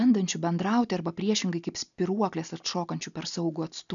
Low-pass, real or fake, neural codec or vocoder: 7.2 kHz; real; none